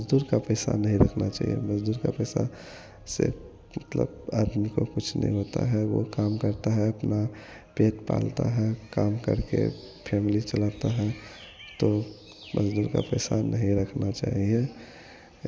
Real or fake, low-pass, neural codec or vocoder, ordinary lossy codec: real; none; none; none